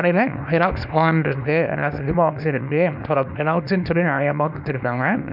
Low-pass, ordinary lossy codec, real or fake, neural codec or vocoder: 5.4 kHz; none; fake; codec, 24 kHz, 0.9 kbps, WavTokenizer, small release